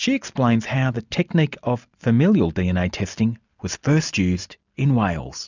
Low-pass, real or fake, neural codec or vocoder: 7.2 kHz; real; none